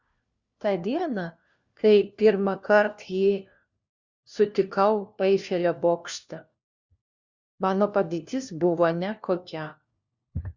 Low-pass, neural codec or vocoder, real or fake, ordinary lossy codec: 7.2 kHz; codec, 16 kHz, 1 kbps, FunCodec, trained on LibriTTS, 50 frames a second; fake; Opus, 64 kbps